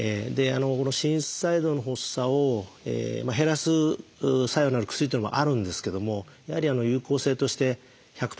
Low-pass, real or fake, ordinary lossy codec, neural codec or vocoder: none; real; none; none